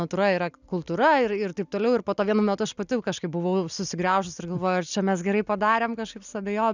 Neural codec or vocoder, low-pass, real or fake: none; 7.2 kHz; real